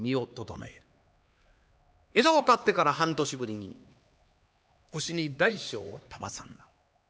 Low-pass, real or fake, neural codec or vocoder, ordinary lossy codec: none; fake; codec, 16 kHz, 2 kbps, X-Codec, HuBERT features, trained on LibriSpeech; none